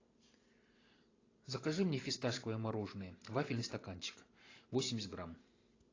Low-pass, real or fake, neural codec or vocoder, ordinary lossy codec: 7.2 kHz; fake; vocoder, 44.1 kHz, 128 mel bands every 512 samples, BigVGAN v2; AAC, 32 kbps